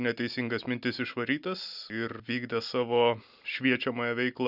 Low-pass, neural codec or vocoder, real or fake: 5.4 kHz; none; real